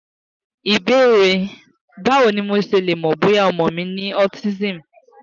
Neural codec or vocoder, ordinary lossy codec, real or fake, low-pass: none; none; real; 7.2 kHz